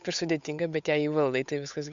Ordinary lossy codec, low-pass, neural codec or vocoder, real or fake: MP3, 64 kbps; 7.2 kHz; codec, 16 kHz, 8 kbps, FunCodec, trained on Chinese and English, 25 frames a second; fake